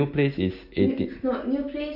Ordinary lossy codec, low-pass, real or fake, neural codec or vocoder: MP3, 48 kbps; 5.4 kHz; real; none